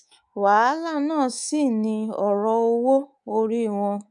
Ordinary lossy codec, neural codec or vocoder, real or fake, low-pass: none; codec, 24 kHz, 3.1 kbps, DualCodec; fake; 10.8 kHz